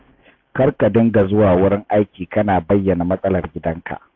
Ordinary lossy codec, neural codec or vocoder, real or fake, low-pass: AAC, 48 kbps; none; real; 7.2 kHz